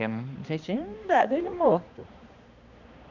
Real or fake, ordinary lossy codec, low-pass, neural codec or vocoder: fake; Opus, 64 kbps; 7.2 kHz; codec, 16 kHz, 2 kbps, X-Codec, HuBERT features, trained on balanced general audio